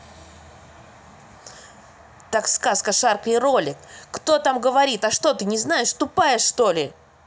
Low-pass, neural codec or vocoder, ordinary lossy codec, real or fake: none; none; none; real